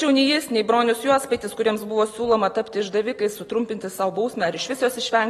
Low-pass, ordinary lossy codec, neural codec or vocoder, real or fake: 19.8 kHz; AAC, 32 kbps; none; real